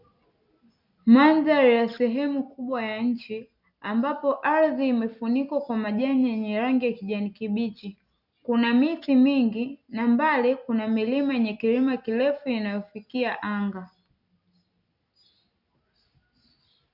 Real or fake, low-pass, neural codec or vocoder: real; 5.4 kHz; none